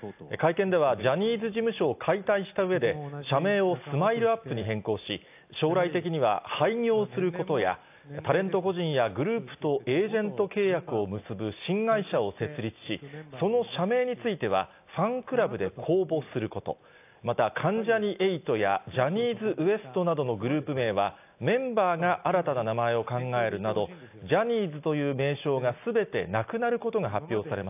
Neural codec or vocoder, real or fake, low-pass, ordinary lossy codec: none; real; 3.6 kHz; AAC, 32 kbps